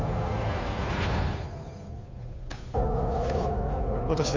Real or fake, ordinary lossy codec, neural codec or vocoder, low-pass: fake; MP3, 64 kbps; codec, 16 kHz, 2 kbps, FunCodec, trained on Chinese and English, 25 frames a second; 7.2 kHz